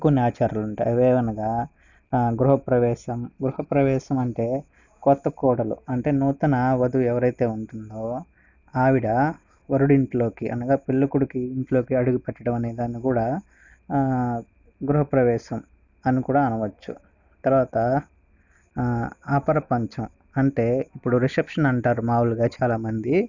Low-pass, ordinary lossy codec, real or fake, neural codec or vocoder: 7.2 kHz; none; real; none